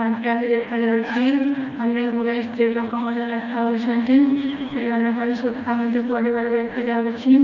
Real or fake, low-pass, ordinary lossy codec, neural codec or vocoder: fake; 7.2 kHz; none; codec, 16 kHz, 1 kbps, FreqCodec, smaller model